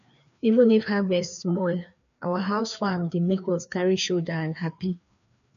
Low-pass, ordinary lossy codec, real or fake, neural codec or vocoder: 7.2 kHz; none; fake; codec, 16 kHz, 2 kbps, FreqCodec, larger model